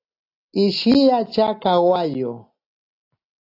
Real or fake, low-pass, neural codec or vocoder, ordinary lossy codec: real; 5.4 kHz; none; AAC, 32 kbps